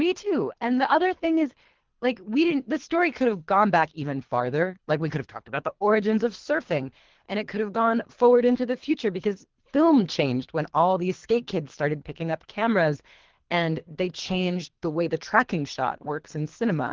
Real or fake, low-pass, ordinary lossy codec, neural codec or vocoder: fake; 7.2 kHz; Opus, 16 kbps; codec, 24 kHz, 3 kbps, HILCodec